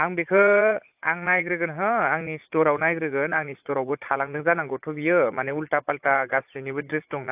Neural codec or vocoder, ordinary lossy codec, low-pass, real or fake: vocoder, 44.1 kHz, 80 mel bands, Vocos; AAC, 32 kbps; 3.6 kHz; fake